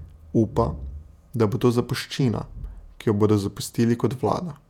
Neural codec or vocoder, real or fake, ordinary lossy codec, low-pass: vocoder, 48 kHz, 128 mel bands, Vocos; fake; none; 19.8 kHz